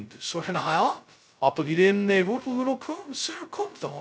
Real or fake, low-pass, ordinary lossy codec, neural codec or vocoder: fake; none; none; codec, 16 kHz, 0.2 kbps, FocalCodec